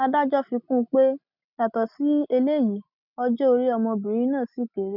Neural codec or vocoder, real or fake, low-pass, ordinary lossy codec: none; real; 5.4 kHz; none